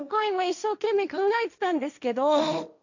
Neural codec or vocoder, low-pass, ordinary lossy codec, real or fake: codec, 16 kHz, 1.1 kbps, Voila-Tokenizer; 7.2 kHz; none; fake